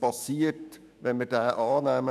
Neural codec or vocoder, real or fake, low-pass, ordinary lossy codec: autoencoder, 48 kHz, 128 numbers a frame, DAC-VAE, trained on Japanese speech; fake; 14.4 kHz; none